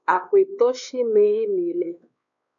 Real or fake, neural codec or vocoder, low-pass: fake; codec, 16 kHz, 2 kbps, X-Codec, WavLM features, trained on Multilingual LibriSpeech; 7.2 kHz